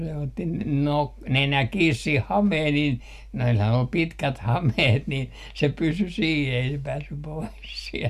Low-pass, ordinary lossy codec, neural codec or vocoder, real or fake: 14.4 kHz; none; none; real